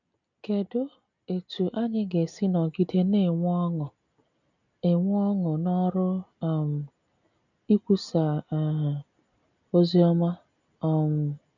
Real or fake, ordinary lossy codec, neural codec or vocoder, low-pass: real; none; none; 7.2 kHz